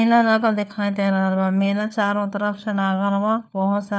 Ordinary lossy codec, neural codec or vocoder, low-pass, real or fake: none; codec, 16 kHz, 4 kbps, FunCodec, trained on LibriTTS, 50 frames a second; none; fake